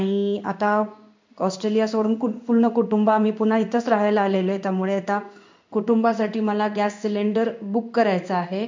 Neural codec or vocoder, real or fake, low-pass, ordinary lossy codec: codec, 16 kHz in and 24 kHz out, 1 kbps, XY-Tokenizer; fake; 7.2 kHz; MP3, 64 kbps